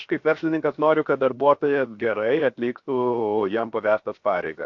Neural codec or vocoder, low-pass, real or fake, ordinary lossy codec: codec, 16 kHz, 0.7 kbps, FocalCodec; 7.2 kHz; fake; AAC, 48 kbps